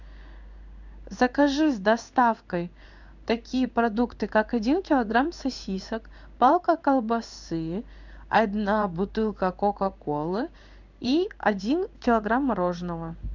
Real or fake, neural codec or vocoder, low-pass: fake; codec, 16 kHz in and 24 kHz out, 1 kbps, XY-Tokenizer; 7.2 kHz